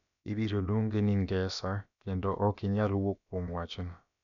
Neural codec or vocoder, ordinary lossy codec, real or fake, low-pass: codec, 16 kHz, about 1 kbps, DyCAST, with the encoder's durations; none; fake; 7.2 kHz